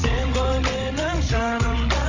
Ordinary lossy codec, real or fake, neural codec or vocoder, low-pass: MP3, 48 kbps; fake; codec, 16 kHz, 16 kbps, FreqCodec, larger model; 7.2 kHz